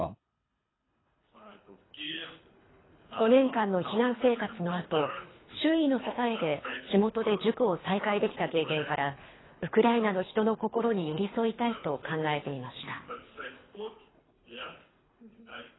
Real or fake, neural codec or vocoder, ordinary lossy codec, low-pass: fake; codec, 24 kHz, 3 kbps, HILCodec; AAC, 16 kbps; 7.2 kHz